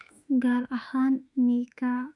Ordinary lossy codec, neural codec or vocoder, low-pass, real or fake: none; autoencoder, 48 kHz, 32 numbers a frame, DAC-VAE, trained on Japanese speech; 10.8 kHz; fake